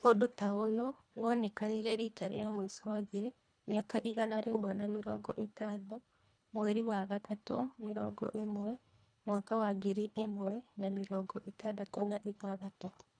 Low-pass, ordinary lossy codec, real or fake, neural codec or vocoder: 9.9 kHz; none; fake; codec, 24 kHz, 1.5 kbps, HILCodec